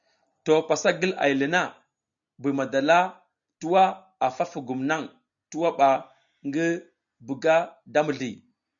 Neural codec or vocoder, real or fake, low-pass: none; real; 7.2 kHz